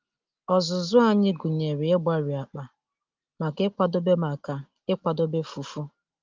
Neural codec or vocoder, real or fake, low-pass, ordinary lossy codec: none; real; 7.2 kHz; Opus, 32 kbps